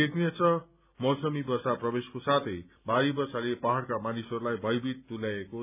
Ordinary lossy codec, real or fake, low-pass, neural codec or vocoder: none; real; 3.6 kHz; none